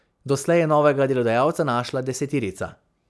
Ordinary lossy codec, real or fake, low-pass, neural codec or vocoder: none; real; none; none